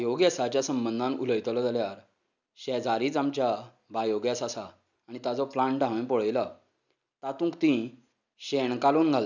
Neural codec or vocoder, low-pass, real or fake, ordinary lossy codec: none; 7.2 kHz; real; none